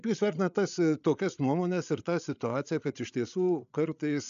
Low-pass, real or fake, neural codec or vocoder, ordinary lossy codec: 7.2 kHz; fake; codec, 16 kHz, 8 kbps, FreqCodec, larger model; AAC, 96 kbps